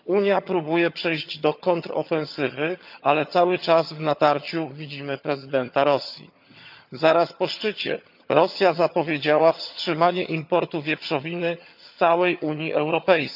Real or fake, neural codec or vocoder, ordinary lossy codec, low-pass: fake; vocoder, 22.05 kHz, 80 mel bands, HiFi-GAN; none; 5.4 kHz